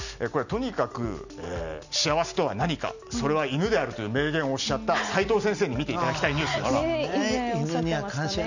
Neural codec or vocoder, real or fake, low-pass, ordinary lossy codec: none; real; 7.2 kHz; none